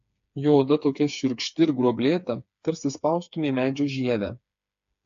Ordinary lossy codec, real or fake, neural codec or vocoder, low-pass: AAC, 64 kbps; fake; codec, 16 kHz, 4 kbps, FreqCodec, smaller model; 7.2 kHz